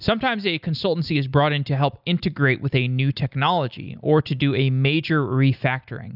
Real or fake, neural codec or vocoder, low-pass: real; none; 5.4 kHz